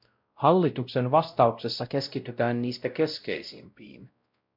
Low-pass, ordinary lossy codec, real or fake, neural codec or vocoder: 5.4 kHz; MP3, 48 kbps; fake; codec, 16 kHz, 0.5 kbps, X-Codec, WavLM features, trained on Multilingual LibriSpeech